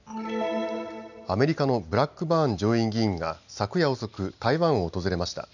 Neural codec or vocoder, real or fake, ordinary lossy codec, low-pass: none; real; none; 7.2 kHz